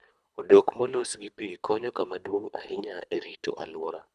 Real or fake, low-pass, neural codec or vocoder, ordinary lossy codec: fake; none; codec, 24 kHz, 3 kbps, HILCodec; none